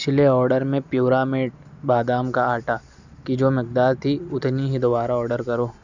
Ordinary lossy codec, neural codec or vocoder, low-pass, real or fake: none; none; 7.2 kHz; real